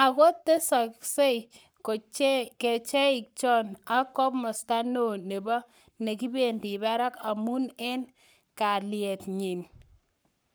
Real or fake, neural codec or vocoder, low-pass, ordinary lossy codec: fake; codec, 44.1 kHz, 7.8 kbps, Pupu-Codec; none; none